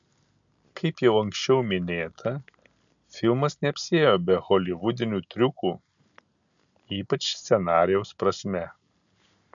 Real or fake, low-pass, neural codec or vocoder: real; 7.2 kHz; none